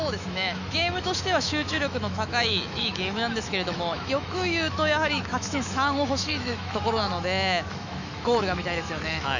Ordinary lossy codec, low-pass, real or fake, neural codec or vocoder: none; 7.2 kHz; fake; autoencoder, 48 kHz, 128 numbers a frame, DAC-VAE, trained on Japanese speech